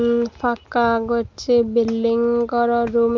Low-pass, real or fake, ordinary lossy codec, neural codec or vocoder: 7.2 kHz; real; Opus, 24 kbps; none